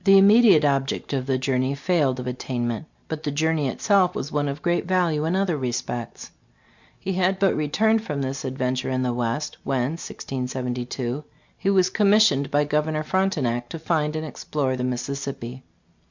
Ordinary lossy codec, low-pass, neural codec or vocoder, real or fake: MP3, 64 kbps; 7.2 kHz; none; real